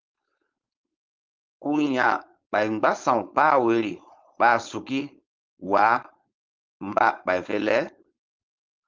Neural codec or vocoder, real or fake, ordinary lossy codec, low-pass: codec, 16 kHz, 4.8 kbps, FACodec; fake; Opus, 24 kbps; 7.2 kHz